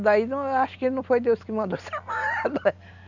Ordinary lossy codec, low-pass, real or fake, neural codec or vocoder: none; 7.2 kHz; real; none